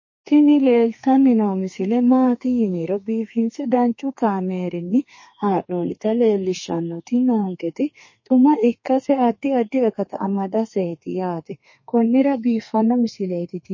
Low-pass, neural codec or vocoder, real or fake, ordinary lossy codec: 7.2 kHz; codec, 32 kHz, 1.9 kbps, SNAC; fake; MP3, 32 kbps